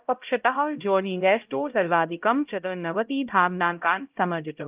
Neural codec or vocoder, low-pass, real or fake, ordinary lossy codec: codec, 16 kHz, 0.5 kbps, X-Codec, HuBERT features, trained on LibriSpeech; 3.6 kHz; fake; Opus, 32 kbps